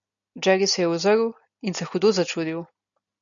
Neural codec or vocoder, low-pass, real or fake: none; 7.2 kHz; real